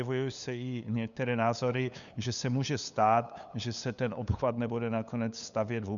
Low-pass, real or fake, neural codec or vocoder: 7.2 kHz; fake; codec, 16 kHz, 8 kbps, FunCodec, trained on LibriTTS, 25 frames a second